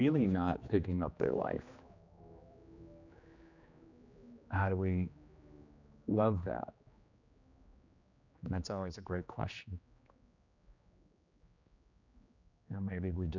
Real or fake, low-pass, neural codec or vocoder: fake; 7.2 kHz; codec, 16 kHz, 2 kbps, X-Codec, HuBERT features, trained on general audio